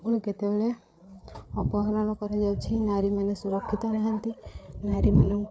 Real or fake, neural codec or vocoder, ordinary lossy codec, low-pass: fake; codec, 16 kHz, 8 kbps, FreqCodec, larger model; none; none